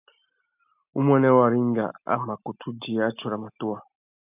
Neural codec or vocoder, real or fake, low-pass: none; real; 3.6 kHz